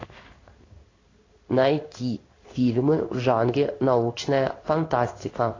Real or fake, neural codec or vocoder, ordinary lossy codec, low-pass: fake; codec, 16 kHz in and 24 kHz out, 1 kbps, XY-Tokenizer; AAC, 32 kbps; 7.2 kHz